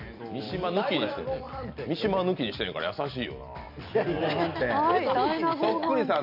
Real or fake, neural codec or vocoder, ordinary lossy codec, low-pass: real; none; none; 5.4 kHz